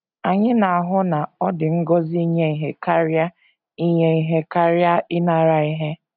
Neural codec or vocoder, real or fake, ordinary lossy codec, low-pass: none; real; none; 5.4 kHz